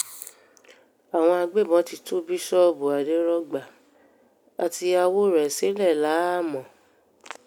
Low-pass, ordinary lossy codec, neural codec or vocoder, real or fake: none; none; none; real